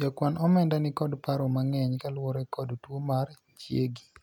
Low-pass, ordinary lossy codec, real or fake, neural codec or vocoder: 19.8 kHz; none; real; none